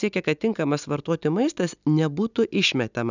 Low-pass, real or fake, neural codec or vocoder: 7.2 kHz; real; none